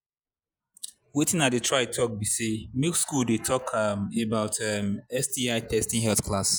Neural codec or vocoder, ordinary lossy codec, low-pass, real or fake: vocoder, 48 kHz, 128 mel bands, Vocos; none; none; fake